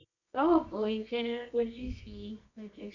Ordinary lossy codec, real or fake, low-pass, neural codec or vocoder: none; fake; 7.2 kHz; codec, 24 kHz, 0.9 kbps, WavTokenizer, medium music audio release